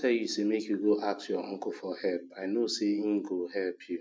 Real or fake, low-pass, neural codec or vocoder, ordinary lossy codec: real; none; none; none